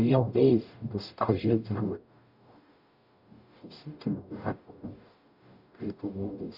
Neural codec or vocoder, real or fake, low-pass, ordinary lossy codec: codec, 44.1 kHz, 0.9 kbps, DAC; fake; 5.4 kHz; none